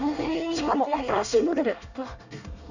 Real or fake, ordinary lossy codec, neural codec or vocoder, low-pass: fake; none; codec, 24 kHz, 1 kbps, SNAC; 7.2 kHz